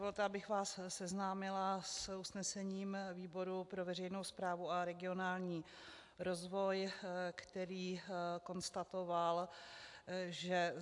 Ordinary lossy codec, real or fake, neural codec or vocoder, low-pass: AAC, 64 kbps; real; none; 10.8 kHz